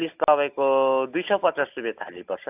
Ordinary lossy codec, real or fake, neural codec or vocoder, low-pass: AAC, 32 kbps; real; none; 3.6 kHz